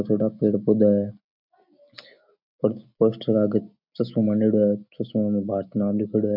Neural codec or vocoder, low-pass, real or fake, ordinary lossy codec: none; 5.4 kHz; real; none